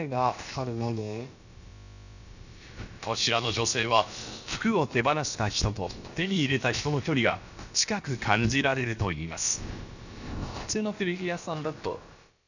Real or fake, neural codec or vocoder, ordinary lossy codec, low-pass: fake; codec, 16 kHz, about 1 kbps, DyCAST, with the encoder's durations; none; 7.2 kHz